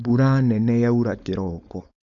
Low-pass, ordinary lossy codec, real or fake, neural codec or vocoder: 7.2 kHz; none; fake; codec, 16 kHz, 4.8 kbps, FACodec